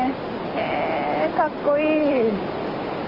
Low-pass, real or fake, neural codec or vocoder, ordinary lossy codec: 5.4 kHz; real; none; Opus, 24 kbps